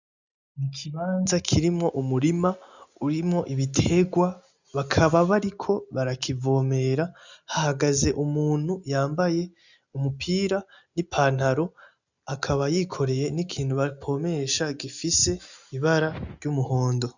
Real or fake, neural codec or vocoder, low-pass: real; none; 7.2 kHz